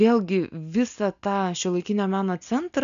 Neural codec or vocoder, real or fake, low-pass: none; real; 7.2 kHz